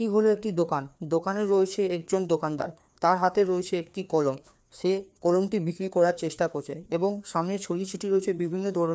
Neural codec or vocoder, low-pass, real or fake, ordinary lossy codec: codec, 16 kHz, 2 kbps, FreqCodec, larger model; none; fake; none